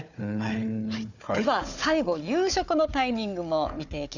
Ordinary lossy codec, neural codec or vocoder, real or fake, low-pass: none; codec, 16 kHz, 4 kbps, FunCodec, trained on Chinese and English, 50 frames a second; fake; 7.2 kHz